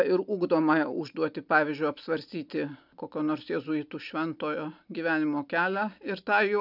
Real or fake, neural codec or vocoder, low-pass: real; none; 5.4 kHz